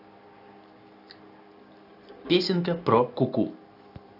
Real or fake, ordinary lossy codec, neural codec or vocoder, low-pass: real; AAC, 32 kbps; none; 5.4 kHz